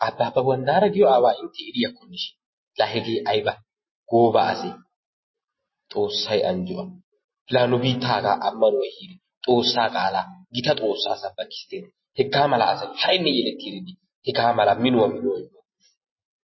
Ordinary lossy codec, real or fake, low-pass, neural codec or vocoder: MP3, 24 kbps; real; 7.2 kHz; none